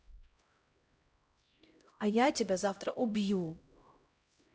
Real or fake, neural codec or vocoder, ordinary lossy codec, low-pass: fake; codec, 16 kHz, 0.5 kbps, X-Codec, HuBERT features, trained on LibriSpeech; none; none